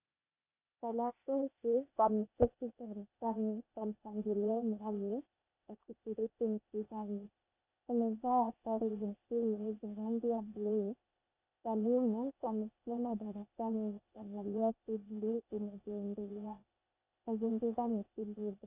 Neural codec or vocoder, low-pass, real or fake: codec, 16 kHz, 0.8 kbps, ZipCodec; 3.6 kHz; fake